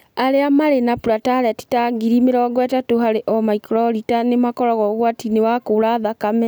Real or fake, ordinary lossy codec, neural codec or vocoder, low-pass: real; none; none; none